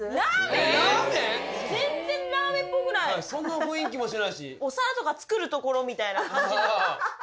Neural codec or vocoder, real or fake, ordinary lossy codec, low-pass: none; real; none; none